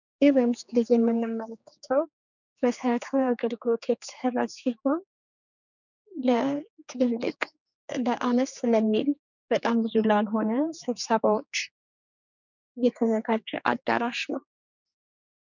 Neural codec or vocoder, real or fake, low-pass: codec, 16 kHz, 4 kbps, X-Codec, HuBERT features, trained on general audio; fake; 7.2 kHz